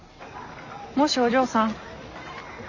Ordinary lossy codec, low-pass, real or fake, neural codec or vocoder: none; 7.2 kHz; real; none